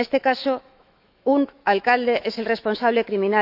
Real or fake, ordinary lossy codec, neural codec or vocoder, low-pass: fake; none; autoencoder, 48 kHz, 128 numbers a frame, DAC-VAE, trained on Japanese speech; 5.4 kHz